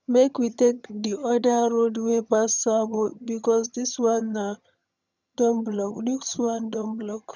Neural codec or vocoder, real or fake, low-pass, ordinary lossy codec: vocoder, 22.05 kHz, 80 mel bands, HiFi-GAN; fake; 7.2 kHz; none